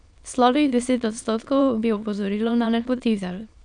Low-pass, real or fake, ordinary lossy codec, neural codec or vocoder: 9.9 kHz; fake; none; autoencoder, 22.05 kHz, a latent of 192 numbers a frame, VITS, trained on many speakers